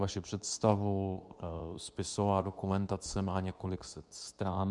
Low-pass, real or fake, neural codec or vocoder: 10.8 kHz; fake; codec, 24 kHz, 0.9 kbps, WavTokenizer, medium speech release version 2